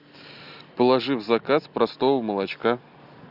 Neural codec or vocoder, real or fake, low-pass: none; real; 5.4 kHz